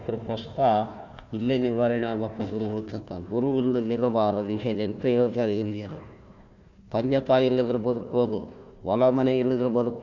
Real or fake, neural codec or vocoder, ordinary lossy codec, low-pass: fake; codec, 16 kHz, 1 kbps, FunCodec, trained on Chinese and English, 50 frames a second; Opus, 64 kbps; 7.2 kHz